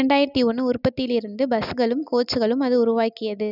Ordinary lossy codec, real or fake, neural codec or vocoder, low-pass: none; real; none; 5.4 kHz